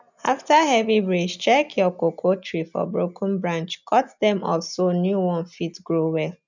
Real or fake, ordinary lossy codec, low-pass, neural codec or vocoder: real; none; 7.2 kHz; none